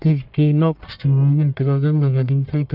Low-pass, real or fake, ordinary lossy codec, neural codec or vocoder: 5.4 kHz; fake; none; codec, 44.1 kHz, 1.7 kbps, Pupu-Codec